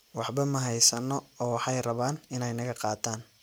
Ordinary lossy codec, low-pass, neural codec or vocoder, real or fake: none; none; none; real